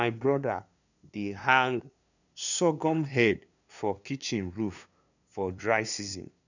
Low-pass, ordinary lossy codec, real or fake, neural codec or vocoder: 7.2 kHz; none; fake; codec, 16 kHz, 2 kbps, FunCodec, trained on LibriTTS, 25 frames a second